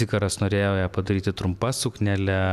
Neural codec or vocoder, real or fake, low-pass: none; real; 14.4 kHz